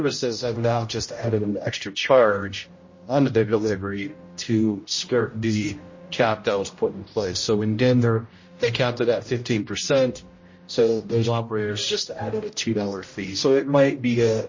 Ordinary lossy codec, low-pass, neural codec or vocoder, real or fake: MP3, 32 kbps; 7.2 kHz; codec, 16 kHz, 0.5 kbps, X-Codec, HuBERT features, trained on general audio; fake